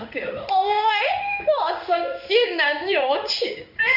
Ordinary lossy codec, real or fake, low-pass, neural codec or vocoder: none; fake; 5.4 kHz; codec, 16 kHz in and 24 kHz out, 1 kbps, XY-Tokenizer